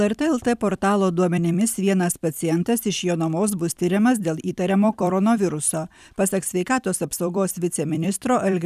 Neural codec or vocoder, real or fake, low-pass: vocoder, 44.1 kHz, 128 mel bands every 256 samples, BigVGAN v2; fake; 14.4 kHz